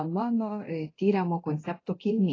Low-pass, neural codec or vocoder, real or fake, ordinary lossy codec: 7.2 kHz; codec, 24 kHz, 0.9 kbps, DualCodec; fake; AAC, 32 kbps